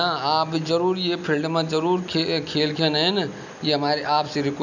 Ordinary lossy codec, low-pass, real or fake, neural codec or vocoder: none; 7.2 kHz; real; none